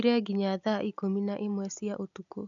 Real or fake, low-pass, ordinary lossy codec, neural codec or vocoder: real; 7.2 kHz; none; none